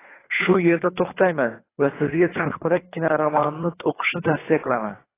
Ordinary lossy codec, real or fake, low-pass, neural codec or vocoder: AAC, 16 kbps; fake; 3.6 kHz; codec, 24 kHz, 3 kbps, HILCodec